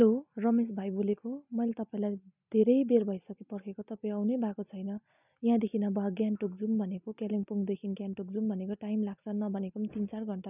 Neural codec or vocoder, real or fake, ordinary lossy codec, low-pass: none; real; none; 3.6 kHz